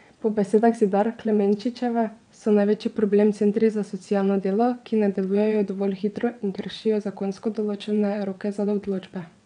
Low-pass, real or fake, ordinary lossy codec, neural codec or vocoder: 9.9 kHz; fake; none; vocoder, 22.05 kHz, 80 mel bands, WaveNeXt